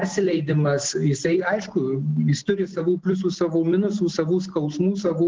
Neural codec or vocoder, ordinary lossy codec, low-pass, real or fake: none; Opus, 16 kbps; 7.2 kHz; real